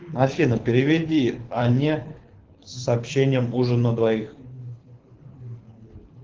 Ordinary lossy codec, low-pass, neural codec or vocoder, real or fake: Opus, 16 kbps; 7.2 kHz; codec, 24 kHz, 6 kbps, HILCodec; fake